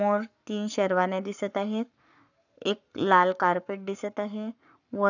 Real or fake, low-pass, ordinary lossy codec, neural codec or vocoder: fake; 7.2 kHz; none; codec, 44.1 kHz, 7.8 kbps, Pupu-Codec